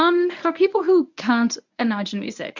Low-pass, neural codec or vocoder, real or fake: 7.2 kHz; codec, 24 kHz, 0.9 kbps, WavTokenizer, medium speech release version 1; fake